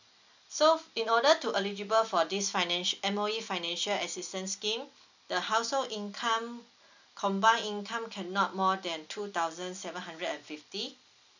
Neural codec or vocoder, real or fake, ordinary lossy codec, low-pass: none; real; none; 7.2 kHz